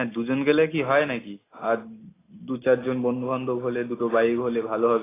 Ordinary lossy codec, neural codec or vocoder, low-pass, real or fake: AAC, 16 kbps; none; 3.6 kHz; real